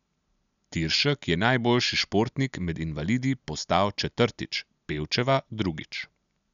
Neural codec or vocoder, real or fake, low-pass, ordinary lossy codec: none; real; 7.2 kHz; none